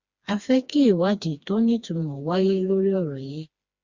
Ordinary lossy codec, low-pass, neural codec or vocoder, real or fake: Opus, 64 kbps; 7.2 kHz; codec, 16 kHz, 2 kbps, FreqCodec, smaller model; fake